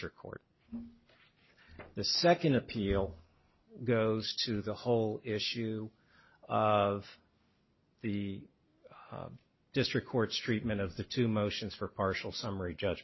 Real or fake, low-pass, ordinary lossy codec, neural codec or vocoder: real; 7.2 kHz; MP3, 24 kbps; none